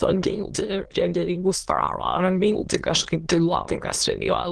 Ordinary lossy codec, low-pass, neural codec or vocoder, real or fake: Opus, 16 kbps; 9.9 kHz; autoencoder, 22.05 kHz, a latent of 192 numbers a frame, VITS, trained on many speakers; fake